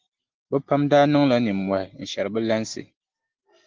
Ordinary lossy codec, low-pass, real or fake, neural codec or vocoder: Opus, 16 kbps; 7.2 kHz; real; none